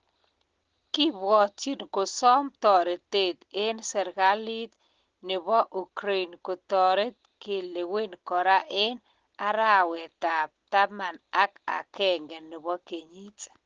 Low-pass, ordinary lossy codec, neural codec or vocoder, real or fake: 7.2 kHz; Opus, 16 kbps; none; real